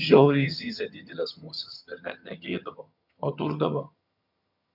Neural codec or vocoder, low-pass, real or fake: vocoder, 22.05 kHz, 80 mel bands, HiFi-GAN; 5.4 kHz; fake